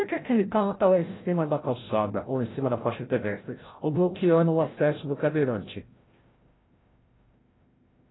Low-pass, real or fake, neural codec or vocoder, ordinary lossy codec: 7.2 kHz; fake; codec, 16 kHz, 0.5 kbps, FreqCodec, larger model; AAC, 16 kbps